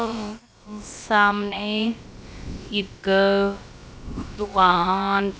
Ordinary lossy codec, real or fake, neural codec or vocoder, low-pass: none; fake; codec, 16 kHz, about 1 kbps, DyCAST, with the encoder's durations; none